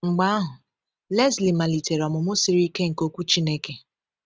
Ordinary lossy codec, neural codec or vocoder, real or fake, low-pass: none; none; real; none